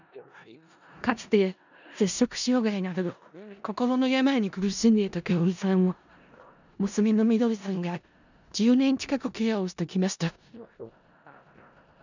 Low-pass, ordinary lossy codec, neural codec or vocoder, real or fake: 7.2 kHz; none; codec, 16 kHz in and 24 kHz out, 0.4 kbps, LongCat-Audio-Codec, four codebook decoder; fake